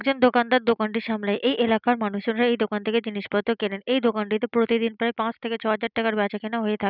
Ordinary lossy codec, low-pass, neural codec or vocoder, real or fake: none; 5.4 kHz; none; real